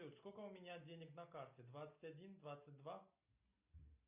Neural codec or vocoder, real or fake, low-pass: none; real; 3.6 kHz